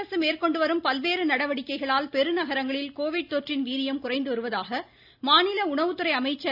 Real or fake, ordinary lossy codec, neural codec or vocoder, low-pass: real; AAC, 48 kbps; none; 5.4 kHz